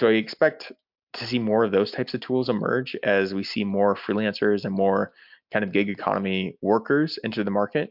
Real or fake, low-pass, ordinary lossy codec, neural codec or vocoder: real; 5.4 kHz; MP3, 48 kbps; none